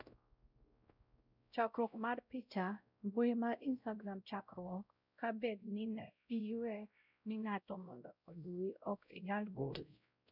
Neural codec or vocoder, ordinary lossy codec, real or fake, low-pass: codec, 16 kHz, 0.5 kbps, X-Codec, WavLM features, trained on Multilingual LibriSpeech; none; fake; 5.4 kHz